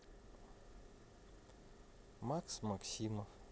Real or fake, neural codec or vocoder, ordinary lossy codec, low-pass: real; none; none; none